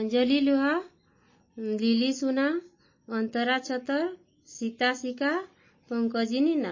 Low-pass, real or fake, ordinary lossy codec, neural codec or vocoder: 7.2 kHz; real; MP3, 32 kbps; none